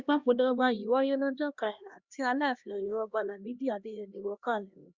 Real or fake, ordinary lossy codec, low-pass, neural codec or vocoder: fake; Opus, 64 kbps; 7.2 kHz; codec, 16 kHz, 1 kbps, X-Codec, HuBERT features, trained on LibriSpeech